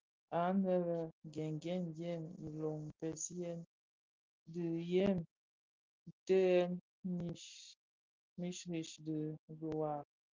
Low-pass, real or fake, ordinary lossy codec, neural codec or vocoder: 7.2 kHz; real; Opus, 32 kbps; none